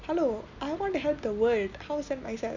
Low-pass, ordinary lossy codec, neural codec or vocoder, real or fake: 7.2 kHz; none; none; real